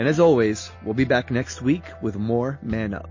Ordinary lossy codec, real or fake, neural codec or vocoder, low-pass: MP3, 32 kbps; real; none; 7.2 kHz